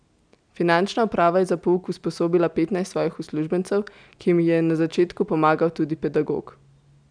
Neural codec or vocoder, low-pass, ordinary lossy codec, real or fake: none; 9.9 kHz; none; real